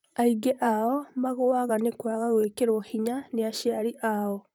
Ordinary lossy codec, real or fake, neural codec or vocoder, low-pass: none; fake; vocoder, 44.1 kHz, 128 mel bands, Pupu-Vocoder; none